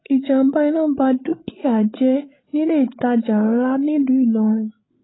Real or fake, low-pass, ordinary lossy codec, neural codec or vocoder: fake; 7.2 kHz; AAC, 16 kbps; codec, 16 kHz, 16 kbps, FreqCodec, larger model